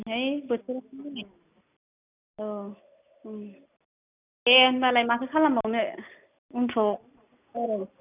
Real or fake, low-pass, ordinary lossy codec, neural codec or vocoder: real; 3.6 kHz; none; none